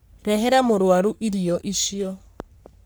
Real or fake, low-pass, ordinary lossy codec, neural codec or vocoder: fake; none; none; codec, 44.1 kHz, 3.4 kbps, Pupu-Codec